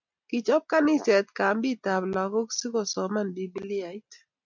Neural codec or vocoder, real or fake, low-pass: none; real; 7.2 kHz